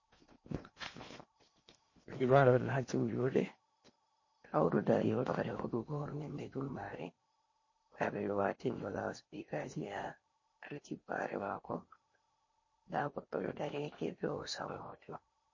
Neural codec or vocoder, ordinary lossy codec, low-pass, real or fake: codec, 16 kHz in and 24 kHz out, 0.8 kbps, FocalCodec, streaming, 65536 codes; MP3, 32 kbps; 7.2 kHz; fake